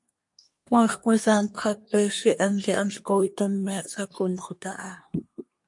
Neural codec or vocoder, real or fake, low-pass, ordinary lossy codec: codec, 24 kHz, 1 kbps, SNAC; fake; 10.8 kHz; MP3, 48 kbps